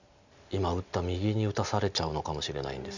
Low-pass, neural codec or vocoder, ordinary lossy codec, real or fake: 7.2 kHz; none; none; real